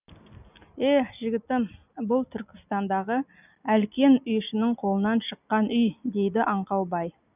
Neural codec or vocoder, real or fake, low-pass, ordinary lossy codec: none; real; 3.6 kHz; none